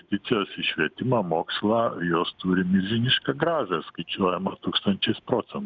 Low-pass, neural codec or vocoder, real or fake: 7.2 kHz; none; real